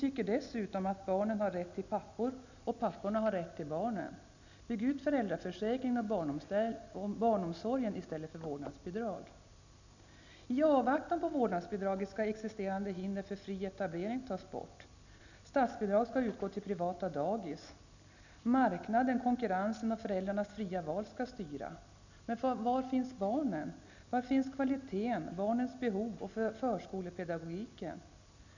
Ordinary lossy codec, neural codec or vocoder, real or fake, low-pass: none; none; real; 7.2 kHz